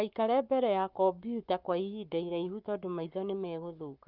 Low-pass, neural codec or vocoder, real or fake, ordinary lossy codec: 5.4 kHz; codec, 44.1 kHz, 7.8 kbps, Pupu-Codec; fake; Opus, 24 kbps